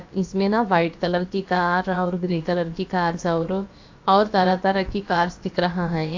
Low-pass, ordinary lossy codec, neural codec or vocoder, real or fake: 7.2 kHz; AAC, 48 kbps; codec, 16 kHz, about 1 kbps, DyCAST, with the encoder's durations; fake